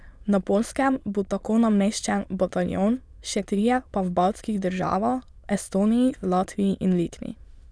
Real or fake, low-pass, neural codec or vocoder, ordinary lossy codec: fake; none; autoencoder, 22.05 kHz, a latent of 192 numbers a frame, VITS, trained on many speakers; none